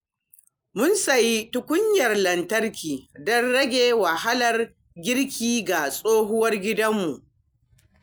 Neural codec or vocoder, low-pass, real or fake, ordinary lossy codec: none; none; real; none